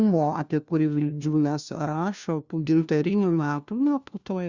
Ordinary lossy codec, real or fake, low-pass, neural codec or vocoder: Opus, 64 kbps; fake; 7.2 kHz; codec, 16 kHz, 1 kbps, FunCodec, trained on LibriTTS, 50 frames a second